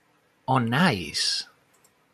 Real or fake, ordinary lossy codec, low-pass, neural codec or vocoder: fake; MP3, 96 kbps; 14.4 kHz; vocoder, 44.1 kHz, 128 mel bands every 512 samples, BigVGAN v2